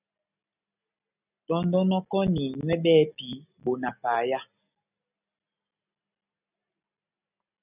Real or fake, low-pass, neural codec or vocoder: real; 3.6 kHz; none